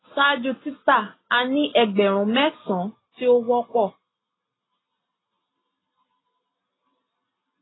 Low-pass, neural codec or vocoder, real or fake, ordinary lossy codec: 7.2 kHz; none; real; AAC, 16 kbps